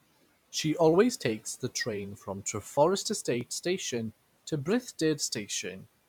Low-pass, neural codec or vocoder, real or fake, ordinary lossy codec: 19.8 kHz; vocoder, 44.1 kHz, 128 mel bands every 512 samples, BigVGAN v2; fake; none